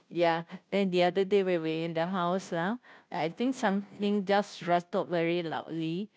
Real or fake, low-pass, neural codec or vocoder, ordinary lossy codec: fake; none; codec, 16 kHz, 0.5 kbps, FunCodec, trained on Chinese and English, 25 frames a second; none